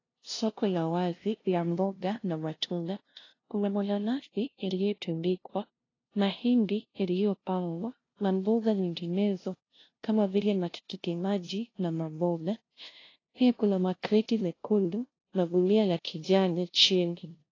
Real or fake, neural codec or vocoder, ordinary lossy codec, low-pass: fake; codec, 16 kHz, 0.5 kbps, FunCodec, trained on LibriTTS, 25 frames a second; AAC, 32 kbps; 7.2 kHz